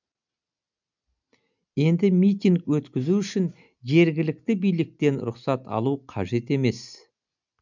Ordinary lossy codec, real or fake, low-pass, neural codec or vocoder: none; real; 7.2 kHz; none